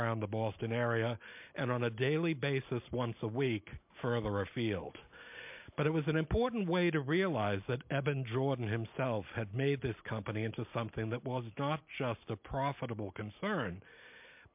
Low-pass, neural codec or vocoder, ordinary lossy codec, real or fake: 3.6 kHz; none; MP3, 32 kbps; real